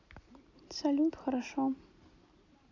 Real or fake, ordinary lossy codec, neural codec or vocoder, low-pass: real; none; none; 7.2 kHz